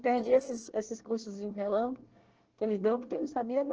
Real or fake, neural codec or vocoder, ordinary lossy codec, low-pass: fake; codec, 24 kHz, 1 kbps, SNAC; Opus, 16 kbps; 7.2 kHz